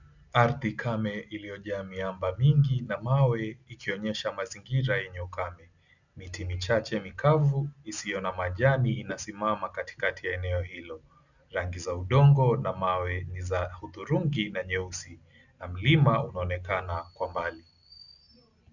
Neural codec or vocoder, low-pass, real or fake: none; 7.2 kHz; real